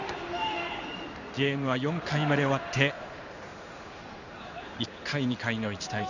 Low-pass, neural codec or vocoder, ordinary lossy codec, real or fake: 7.2 kHz; codec, 16 kHz in and 24 kHz out, 1 kbps, XY-Tokenizer; none; fake